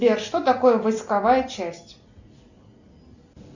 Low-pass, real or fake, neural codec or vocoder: 7.2 kHz; real; none